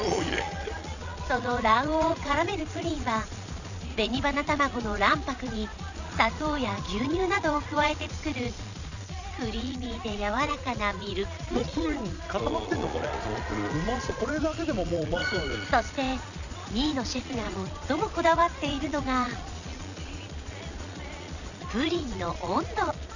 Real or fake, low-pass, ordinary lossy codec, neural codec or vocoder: fake; 7.2 kHz; none; vocoder, 22.05 kHz, 80 mel bands, Vocos